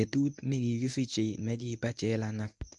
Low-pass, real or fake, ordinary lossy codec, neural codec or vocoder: 10.8 kHz; fake; MP3, 96 kbps; codec, 24 kHz, 0.9 kbps, WavTokenizer, medium speech release version 1